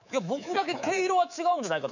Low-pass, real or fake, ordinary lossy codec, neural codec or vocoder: 7.2 kHz; fake; none; codec, 24 kHz, 3.1 kbps, DualCodec